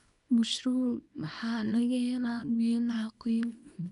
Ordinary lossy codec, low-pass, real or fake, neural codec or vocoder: none; 10.8 kHz; fake; codec, 24 kHz, 0.9 kbps, WavTokenizer, small release